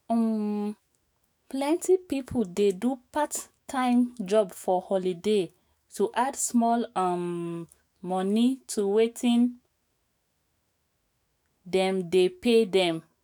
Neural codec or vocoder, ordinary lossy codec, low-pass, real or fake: autoencoder, 48 kHz, 128 numbers a frame, DAC-VAE, trained on Japanese speech; none; none; fake